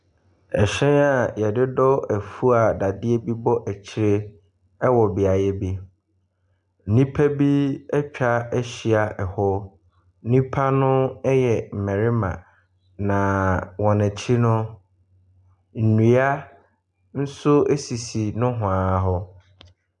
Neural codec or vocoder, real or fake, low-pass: none; real; 10.8 kHz